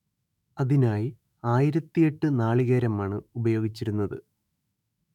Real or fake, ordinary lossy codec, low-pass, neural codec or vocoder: fake; none; 19.8 kHz; autoencoder, 48 kHz, 128 numbers a frame, DAC-VAE, trained on Japanese speech